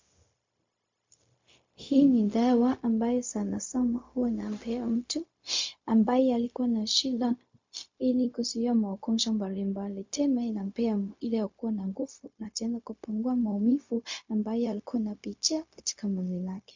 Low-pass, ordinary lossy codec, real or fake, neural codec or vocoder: 7.2 kHz; MP3, 48 kbps; fake; codec, 16 kHz, 0.4 kbps, LongCat-Audio-Codec